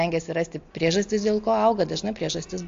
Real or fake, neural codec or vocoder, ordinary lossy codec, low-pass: real; none; AAC, 48 kbps; 7.2 kHz